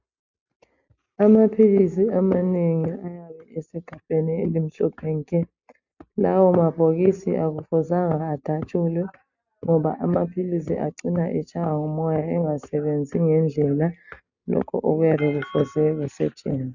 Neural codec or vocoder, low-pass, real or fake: none; 7.2 kHz; real